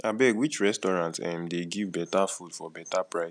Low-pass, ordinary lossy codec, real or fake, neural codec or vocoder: 9.9 kHz; none; real; none